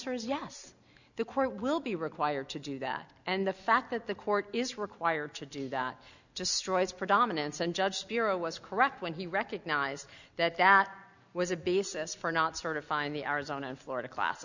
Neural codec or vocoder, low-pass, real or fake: none; 7.2 kHz; real